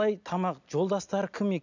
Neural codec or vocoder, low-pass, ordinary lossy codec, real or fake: none; 7.2 kHz; none; real